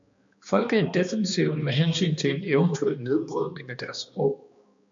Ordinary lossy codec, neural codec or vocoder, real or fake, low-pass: MP3, 48 kbps; codec, 16 kHz, 2 kbps, X-Codec, HuBERT features, trained on balanced general audio; fake; 7.2 kHz